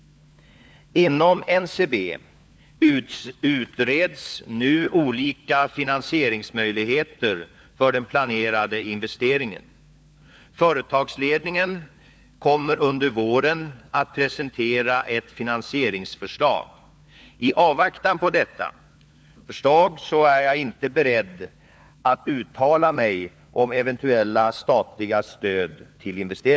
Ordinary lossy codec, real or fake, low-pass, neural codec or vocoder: none; fake; none; codec, 16 kHz, 4 kbps, FunCodec, trained on LibriTTS, 50 frames a second